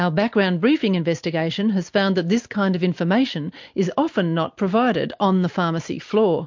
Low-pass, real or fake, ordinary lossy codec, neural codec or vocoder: 7.2 kHz; real; MP3, 48 kbps; none